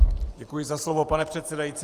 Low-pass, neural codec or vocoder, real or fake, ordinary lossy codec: 14.4 kHz; vocoder, 44.1 kHz, 128 mel bands every 512 samples, BigVGAN v2; fake; Opus, 32 kbps